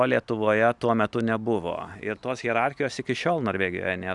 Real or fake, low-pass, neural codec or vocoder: real; 10.8 kHz; none